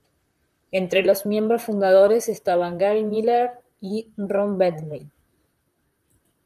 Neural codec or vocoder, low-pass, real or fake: vocoder, 44.1 kHz, 128 mel bands, Pupu-Vocoder; 14.4 kHz; fake